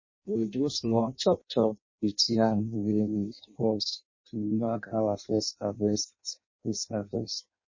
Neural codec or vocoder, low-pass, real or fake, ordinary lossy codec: codec, 16 kHz in and 24 kHz out, 0.6 kbps, FireRedTTS-2 codec; 7.2 kHz; fake; MP3, 32 kbps